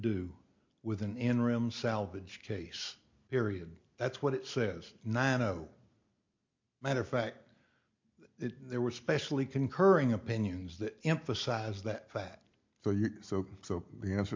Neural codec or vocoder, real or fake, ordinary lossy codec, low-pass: none; real; MP3, 48 kbps; 7.2 kHz